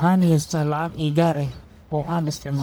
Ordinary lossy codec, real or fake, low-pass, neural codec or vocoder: none; fake; none; codec, 44.1 kHz, 1.7 kbps, Pupu-Codec